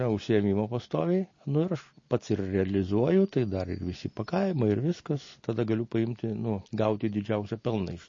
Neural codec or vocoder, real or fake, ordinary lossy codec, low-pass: none; real; MP3, 32 kbps; 7.2 kHz